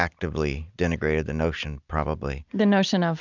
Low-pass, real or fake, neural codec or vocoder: 7.2 kHz; real; none